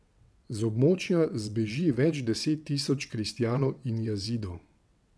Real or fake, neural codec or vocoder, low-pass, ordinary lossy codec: fake; vocoder, 22.05 kHz, 80 mel bands, WaveNeXt; none; none